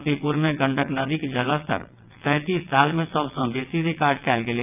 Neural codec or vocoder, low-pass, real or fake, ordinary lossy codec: vocoder, 22.05 kHz, 80 mel bands, WaveNeXt; 3.6 kHz; fake; none